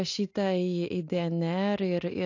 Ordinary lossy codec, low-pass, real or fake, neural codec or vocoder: AAC, 48 kbps; 7.2 kHz; real; none